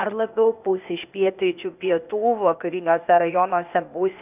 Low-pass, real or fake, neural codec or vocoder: 3.6 kHz; fake; codec, 16 kHz, 0.8 kbps, ZipCodec